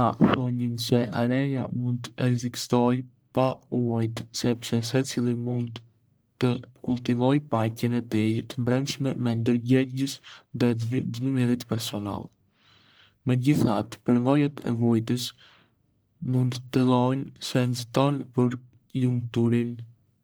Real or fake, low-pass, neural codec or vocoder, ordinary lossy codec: fake; none; codec, 44.1 kHz, 1.7 kbps, Pupu-Codec; none